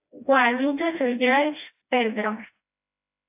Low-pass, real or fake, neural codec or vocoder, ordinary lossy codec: 3.6 kHz; fake; codec, 16 kHz, 1 kbps, FreqCodec, smaller model; AAC, 32 kbps